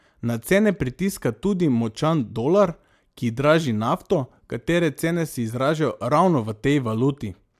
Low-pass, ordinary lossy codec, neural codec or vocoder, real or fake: 14.4 kHz; AAC, 96 kbps; none; real